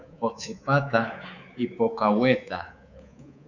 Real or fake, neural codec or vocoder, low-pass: fake; codec, 24 kHz, 3.1 kbps, DualCodec; 7.2 kHz